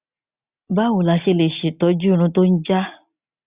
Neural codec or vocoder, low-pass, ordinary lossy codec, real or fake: none; 3.6 kHz; Opus, 64 kbps; real